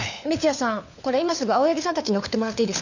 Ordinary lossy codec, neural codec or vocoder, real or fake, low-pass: none; codec, 16 kHz, 4 kbps, FunCodec, trained on LibriTTS, 50 frames a second; fake; 7.2 kHz